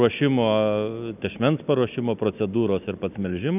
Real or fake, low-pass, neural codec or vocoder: real; 3.6 kHz; none